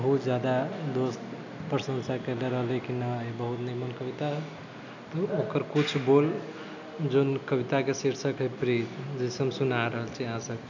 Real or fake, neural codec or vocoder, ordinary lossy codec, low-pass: real; none; none; 7.2 kHz